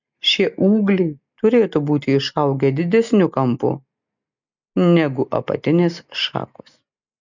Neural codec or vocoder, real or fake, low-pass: none; real; 7.2 kHz